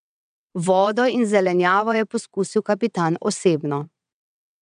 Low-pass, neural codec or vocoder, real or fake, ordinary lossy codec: 9.9 kHz; vocoder, 22.05 kHz, 80 mel bands, WaveNeXt; fake; none